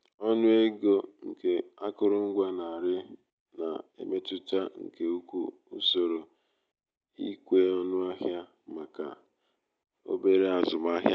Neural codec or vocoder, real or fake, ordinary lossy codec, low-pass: none; real; none; none